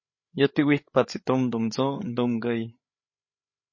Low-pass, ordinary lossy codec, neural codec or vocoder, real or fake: 7.2 kHz; MP3, 32 kbps; codec, 16 kHz, 8 kbps, FreqCodec, larger model; fake